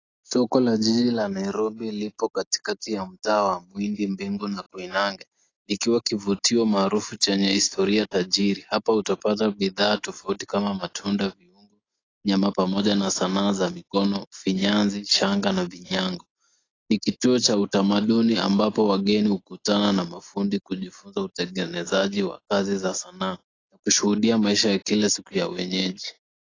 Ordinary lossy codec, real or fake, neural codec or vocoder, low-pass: AAC, 32 kbps; real; none; 7.2 kHz